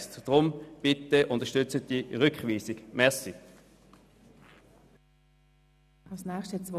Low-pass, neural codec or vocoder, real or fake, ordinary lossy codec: 14.4 kHz; none; real; none